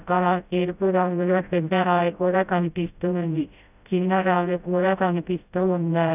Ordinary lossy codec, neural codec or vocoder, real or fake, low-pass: none; codec, 16 kHz, 0.5 kbps, FreqCodec, smaller model; fake; 3.6 kHz